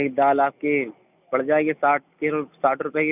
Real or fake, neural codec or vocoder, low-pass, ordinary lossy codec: real; none; 3.6 kHz; none